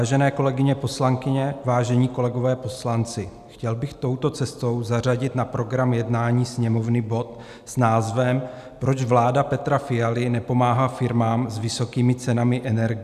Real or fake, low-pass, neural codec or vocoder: real; 14.4 kHz; none